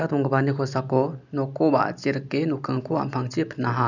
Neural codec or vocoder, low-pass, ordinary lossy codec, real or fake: none; 7.2 kHz; none; real